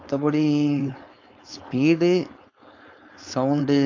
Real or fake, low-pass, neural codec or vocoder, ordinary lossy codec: fake; 7.2 kHz; codec, 16 kHz, 4.8 kbps, FACodec; AAC, 48 kbps